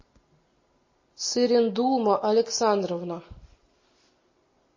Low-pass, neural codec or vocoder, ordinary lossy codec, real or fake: 7.2 kHz; none; MP3, 32 kbps; real